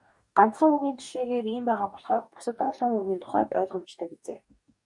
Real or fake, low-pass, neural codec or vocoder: fake; 10.8 kHz; codec, 44.1 kHz, 2.6 kbps, DAC